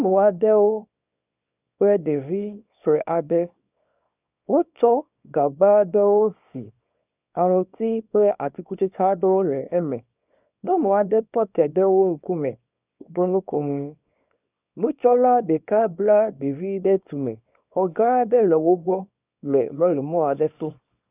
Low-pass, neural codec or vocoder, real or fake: 3.6 kHz; codec, 24 kHz, 0.9 kbps, WavTokenizer, small release; fake